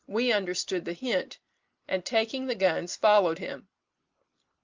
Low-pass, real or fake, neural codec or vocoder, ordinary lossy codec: 7.2 kHz; real; none; Opus, 32 kbps